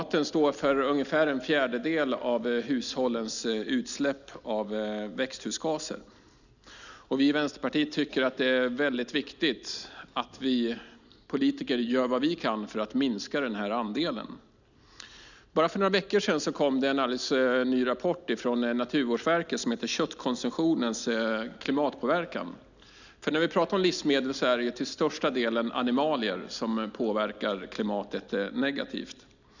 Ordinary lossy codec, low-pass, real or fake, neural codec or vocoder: none; 7.2 kHz; real; none